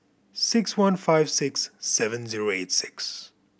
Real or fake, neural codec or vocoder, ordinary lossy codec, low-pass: real; none; none; none